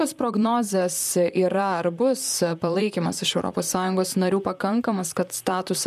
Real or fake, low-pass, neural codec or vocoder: fake; 14.4 kHz; vocoder, 44.1 kHz, 128 mel bands, Pupu-Vocoder